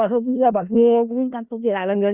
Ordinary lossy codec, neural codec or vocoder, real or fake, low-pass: Opus, 64 kbps; codec, 16 kHz in and 24 kHz out, 0.4 kbps, LongCat-Audio-Codec, four codebook decoder; fake; 3.6 kHz